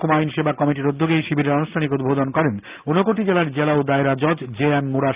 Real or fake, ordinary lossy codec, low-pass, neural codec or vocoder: real; Opus, 32 kbps; 3.6 kHz; none